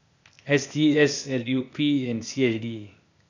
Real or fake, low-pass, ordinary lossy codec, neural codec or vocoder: fake; 7.2 kHz; none; codec, 16 kHz, 0.8 kbps, ZipCodec